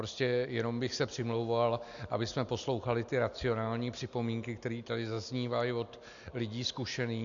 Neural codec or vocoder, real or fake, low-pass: none; real; 7.2 kHz